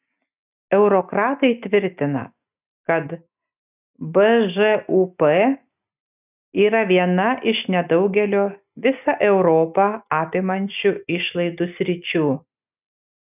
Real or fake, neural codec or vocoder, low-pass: real; none; 3.6 kHz